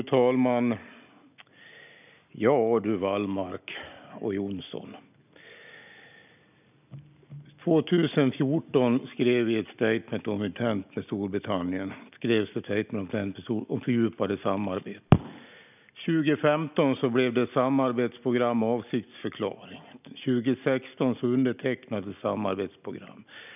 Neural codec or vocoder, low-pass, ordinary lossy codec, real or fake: none; 3.6 kHz; none; real